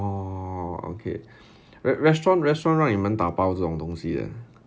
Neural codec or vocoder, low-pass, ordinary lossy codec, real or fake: none; none; none; real